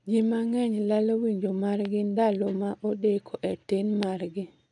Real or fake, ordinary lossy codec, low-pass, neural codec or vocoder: real; none; 10.8 kHz; none